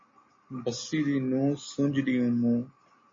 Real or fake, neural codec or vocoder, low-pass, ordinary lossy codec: real; none; 7.2 kHz; MP3, 32 kbps